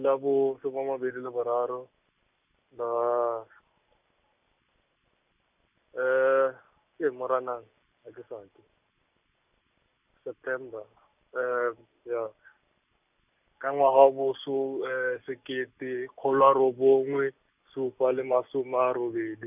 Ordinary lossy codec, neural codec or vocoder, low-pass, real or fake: none; none; 3.6 kHz; real